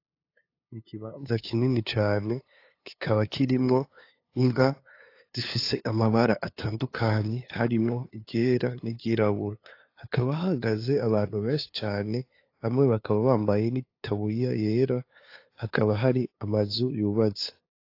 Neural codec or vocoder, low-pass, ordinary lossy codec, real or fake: codec, 16 kHz, 2 kbps, FunCodec, trained on LibriTTS, 25 frames a second; 5.4 kHz; AAC, 32 kbps; fake